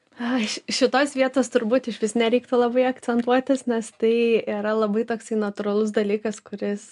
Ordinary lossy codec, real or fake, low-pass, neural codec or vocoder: MP3, 64 kbps; real; 10.8 kHz; none